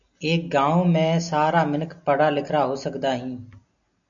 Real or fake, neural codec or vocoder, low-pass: real; none; 7.2 kHz